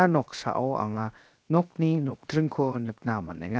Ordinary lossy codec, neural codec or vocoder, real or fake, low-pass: none; codec, 16 kHz, 0.7 kbps, FocalCodec; fake; none